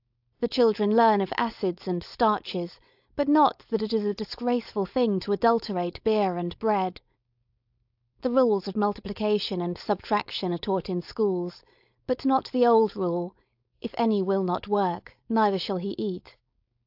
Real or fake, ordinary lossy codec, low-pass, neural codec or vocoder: fake; AAC, 48 kbps; 5.4 kHz; codec, 16 kHz, 4.8 kbps, FACodec